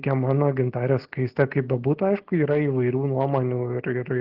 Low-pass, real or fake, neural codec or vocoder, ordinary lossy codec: 5.4 kHz; real; none; Opus, 16 kbps